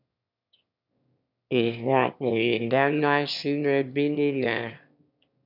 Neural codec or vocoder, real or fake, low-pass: autoencoder, 22.05 kHz, a latent of 192 numbers a frame, VITS, trained on one speaker; fake; 5.4 kHz